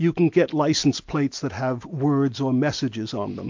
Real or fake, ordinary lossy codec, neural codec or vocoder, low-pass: real; MP3, 48 kbps; none; 7.2 kHz